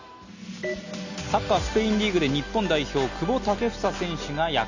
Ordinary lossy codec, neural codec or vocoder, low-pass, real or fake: Opus, 64 kbps; none; 7.2 kHz; real